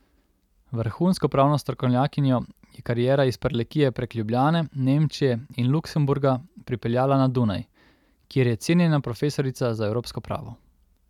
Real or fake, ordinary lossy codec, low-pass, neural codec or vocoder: real; none; 19.8 kHz; none